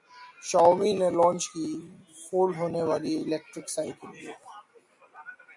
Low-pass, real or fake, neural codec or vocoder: 10.8 kHz; real; none